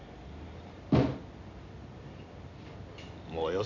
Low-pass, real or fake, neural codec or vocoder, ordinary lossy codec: 7.2 kHz; real; none; none